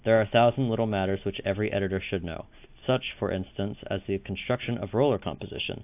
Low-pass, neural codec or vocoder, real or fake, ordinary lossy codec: 3.6 kHz; none; real; AAC, 32 kbps